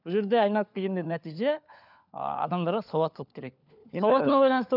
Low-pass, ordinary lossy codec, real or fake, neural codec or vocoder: 5.4 kHz; none; fake; codec, 16 kHz, 4 kbps, FunCodec, trained on LibriTTS, 50 frames a second